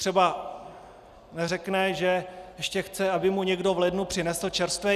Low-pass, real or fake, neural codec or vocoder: 14.4 kHz; real; none